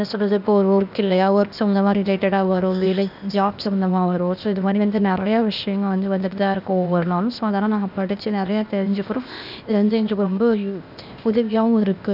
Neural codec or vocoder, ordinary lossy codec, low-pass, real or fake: codec, 16 kHz, 0.8 kbps, ZipCodec; none; 5.4 kHz; fake